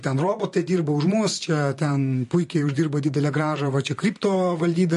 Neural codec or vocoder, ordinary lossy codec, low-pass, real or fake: vocoder, 44.1 kHz, 128 mel bands, Pupu-Vocoder; MP3, 48 kbps; 14.4 kHz; fake